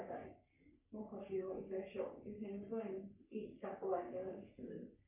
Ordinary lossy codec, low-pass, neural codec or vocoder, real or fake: none; 3.6 kHz; codec, 44.1 kHz, 3.4 kbps, Pupu-Codec; fake